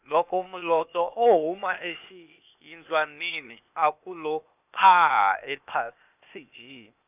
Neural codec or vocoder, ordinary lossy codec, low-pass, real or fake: codec, 16 kHz, 0.8 kbps, ZipCodec; none; 3.6 kHz; fake